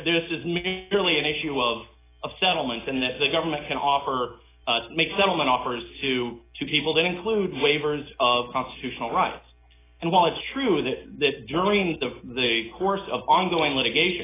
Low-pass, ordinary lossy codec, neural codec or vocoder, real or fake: 3.6 kHz; AAC, 16 kbps; none; real